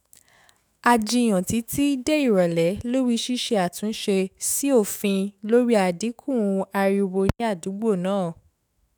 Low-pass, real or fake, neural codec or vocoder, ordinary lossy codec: none; fake; autoencoder, 48 kHz, 128 numbers a frame, DAC-VAE, trained on Japanese speech; none